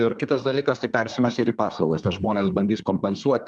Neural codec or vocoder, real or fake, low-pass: codec, 24 kHz, 1 kbps, SNAC; fake; 10.8 kHz